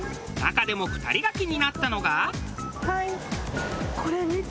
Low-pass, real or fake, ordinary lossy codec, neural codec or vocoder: none; real; none; none